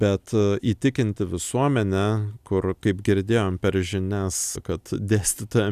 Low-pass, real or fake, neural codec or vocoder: 14.4 kHz; real; none